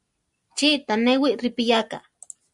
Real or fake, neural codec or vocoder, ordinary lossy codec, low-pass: real; none; Opus, 64 kbps; 10.8 kHz